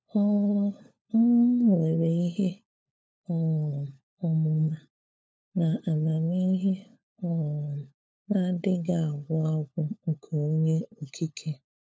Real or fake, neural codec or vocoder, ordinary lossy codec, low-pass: fake; codec, 16 kHz, 4 kbps, FunCodec, trained on LibriTTS, 50 frames a second; none; none